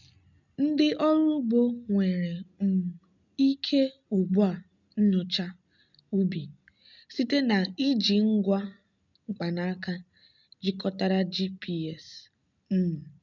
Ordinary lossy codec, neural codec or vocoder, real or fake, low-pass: none; none; real; 7.2 kHz